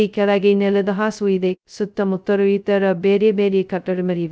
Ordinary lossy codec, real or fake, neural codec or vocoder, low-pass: none; fake; codec, 16 kHz, 0.2 kbps, FocalCodec; none